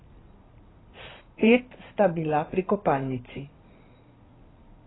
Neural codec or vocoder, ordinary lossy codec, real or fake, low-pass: codec, 16 kHz in and 24 kHz out, 2.2 kbps, FireRedTTS-2 codec; AAC, 16 kbps; fake; 7.2 kHz